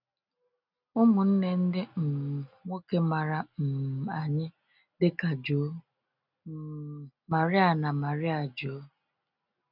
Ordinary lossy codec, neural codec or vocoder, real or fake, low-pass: none; none; real; 5.4 kHz